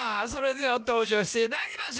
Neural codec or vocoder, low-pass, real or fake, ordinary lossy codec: codec, 16 kHz, about 1 kbps, DyCAST, with the encoder's durations; none; fake; none